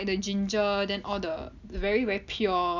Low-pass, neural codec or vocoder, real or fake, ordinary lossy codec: 7.2 kHz; none; real; none